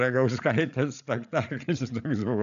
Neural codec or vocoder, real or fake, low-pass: codec, 16 kHz, 8 kbps, FreqCodec, larger model; fake; 7.2 kHz